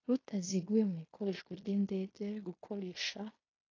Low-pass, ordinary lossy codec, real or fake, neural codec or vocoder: 7.2 kHz; AAC, 32 kbps; fake; codec, 16 kHz in and 24 kHz out, 0.9 kbps, LongCat-Audio-Codec, fine tuned four codebook decoder